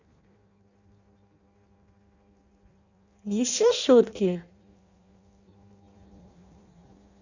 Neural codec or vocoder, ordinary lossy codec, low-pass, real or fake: codec, 16 kHz in and 24 kHz out, 0.6 kbps, FireRedTTS-2 codec; Opus, 64 kbps; 7.2 kHz; fake